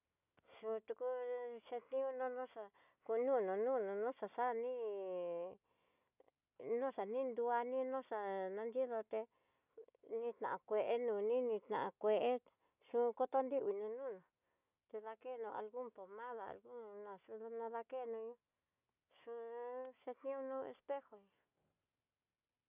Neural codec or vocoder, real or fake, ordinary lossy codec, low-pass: none; real; none; 3.6 kHz